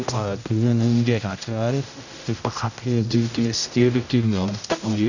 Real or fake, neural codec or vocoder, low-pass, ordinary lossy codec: fake; codec, 16 kHz, 0.5 kbps, X-Codec, HuBERT features, trained on general audio; 7.2 kHz; none